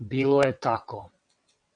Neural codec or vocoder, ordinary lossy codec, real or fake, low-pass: vocoder, 22.05 kHz, 80 mel bands, WaveNeXt; MP3, 64 kbps; fake; 9.9 kHz